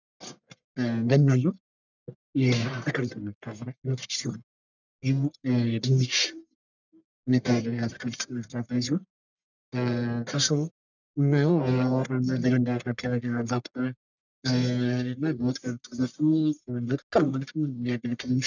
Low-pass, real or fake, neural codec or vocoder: 7.2 kHz; fake; codec, 44.1 kHz, 1.7 kbps, Pupu-Codec